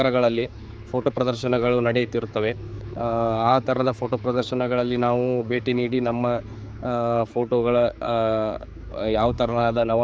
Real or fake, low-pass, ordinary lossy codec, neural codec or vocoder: fake; none; none; codec, 16 kHz, 4 kbps, X-Codec, HuBERT features, trained on general audio